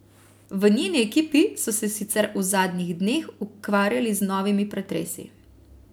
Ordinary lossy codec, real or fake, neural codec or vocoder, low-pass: none; real; none; none